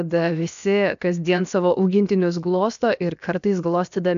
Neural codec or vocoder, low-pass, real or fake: codec, 16 kHz, 0.7 kbps, FocalCodec; 7.2 kHz; fake